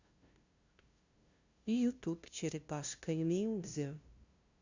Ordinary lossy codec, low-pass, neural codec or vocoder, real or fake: Opus, 64 kbps; 7.2 kHz; codec, 16 kHz, 0.5 kbps, FunCodec, trained on LibriTTS, 25 frames a second; fake